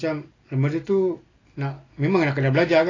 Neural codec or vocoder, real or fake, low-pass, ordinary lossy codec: none; real; 7.2 kHz; AAC, 32 kbps